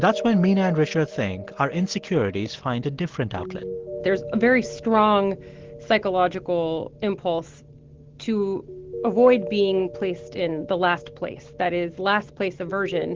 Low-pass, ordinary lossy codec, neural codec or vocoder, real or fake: 7.2 kHz; Opus, 16 kbps; none; real